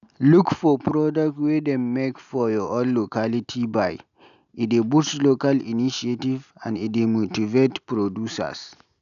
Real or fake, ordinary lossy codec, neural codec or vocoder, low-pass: real; none; none; 7.2 kHz